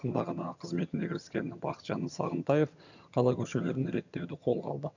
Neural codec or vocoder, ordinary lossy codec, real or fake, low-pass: vocoder, 22.05 kHz, 80 mel bands, HiFi-GAN; none; fake; 7.2 kHz